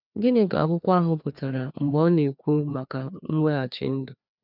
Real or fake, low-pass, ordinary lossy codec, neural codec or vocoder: fake; 5.4 kHz; none; codec, 16 kHz, 2 kbps, FreqCodec, larger model